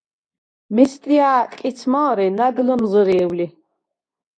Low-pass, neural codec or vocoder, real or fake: 9.9 kHz; codec, 24 kHz, 0.9 kbps, WavTokenizer, medium speech release version 2; fake